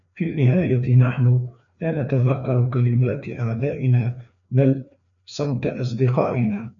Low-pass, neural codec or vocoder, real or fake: 7.2 kHz; codec, 16 kHz, 2 kbps, FreqCodec, larger model; fake